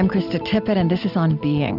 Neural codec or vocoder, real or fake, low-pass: none; real; 5.4 kHz